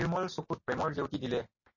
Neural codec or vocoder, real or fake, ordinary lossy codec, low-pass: none; real; MP3, 32 kbps; 7.2 kHz